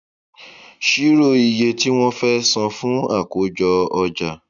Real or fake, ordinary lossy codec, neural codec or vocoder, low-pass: real; none; none; 7.2 kHz